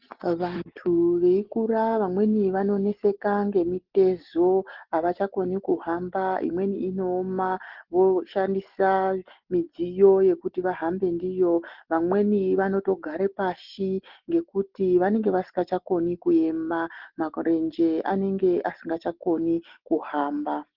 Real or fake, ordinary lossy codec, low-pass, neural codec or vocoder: real; Opus, 16 kbps; 5.4 kHz; none